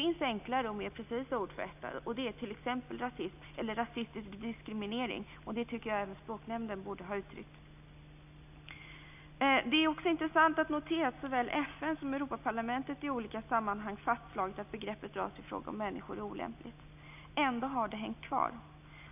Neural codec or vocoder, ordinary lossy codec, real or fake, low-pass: none; none; real; 3.6 kHz